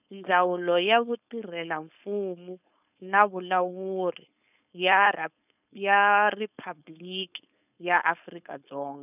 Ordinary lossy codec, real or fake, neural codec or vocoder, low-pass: none; fake; codec, 16 kHz, 4.8 kbps, FACodec; 3.6 kHz